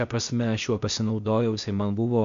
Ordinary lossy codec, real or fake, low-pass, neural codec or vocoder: MP3, 64 kbps; fake; 7.2 kHz; codec, 16 kHz, 0.8 kbps, ZipCodec